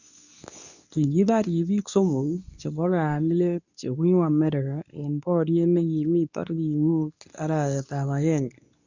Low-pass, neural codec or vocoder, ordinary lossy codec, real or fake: 7.2 kHz; codec, 24 kHz, 0.9 kbps, WavTokenizer, medium speech release version 2; none; fake